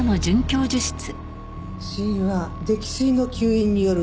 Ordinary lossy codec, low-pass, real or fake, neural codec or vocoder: none; none; real; none